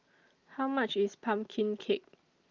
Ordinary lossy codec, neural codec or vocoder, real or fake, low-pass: Opus, 32 kbps; none; real; 7.2 kHz